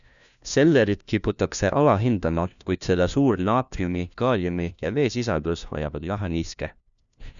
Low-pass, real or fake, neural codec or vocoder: 7.2 kHz; fake; codec, 16 kHz, 1 kbps, FunCodec, trained on LibriTTS, 50 frames a second